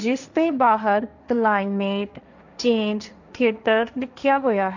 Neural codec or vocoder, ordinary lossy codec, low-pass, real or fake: codec, 16 kHz, 1.1 kbps, Voila-Tokenizer; none; 7.2 kHz; fake